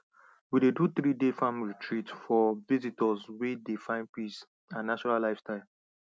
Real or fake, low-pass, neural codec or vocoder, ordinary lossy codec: real; none; none; none